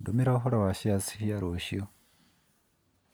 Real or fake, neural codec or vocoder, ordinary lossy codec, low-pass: real; none; none; none